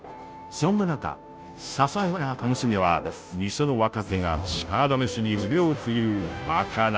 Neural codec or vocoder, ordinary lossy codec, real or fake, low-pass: codec, 16 kHz, 0.5 kbps, FunCodec, trained on Chinese and English, 25 frames a second; none; fake; none